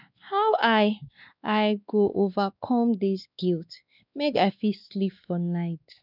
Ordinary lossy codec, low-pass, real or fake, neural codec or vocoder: none; 5.4 kHz; fake; codec, 16 kHz, 2 kbps, X-Codec, WavLM features, trained on Multilingual LibriSpeech